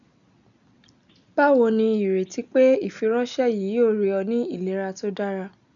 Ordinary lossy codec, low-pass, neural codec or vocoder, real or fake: none; 7.2 kHz; none; real